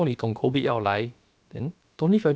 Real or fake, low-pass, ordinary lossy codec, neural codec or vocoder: fake; none; none; codec, 16 kHz, about 1 kbps, DyCAST, with the encoder's durations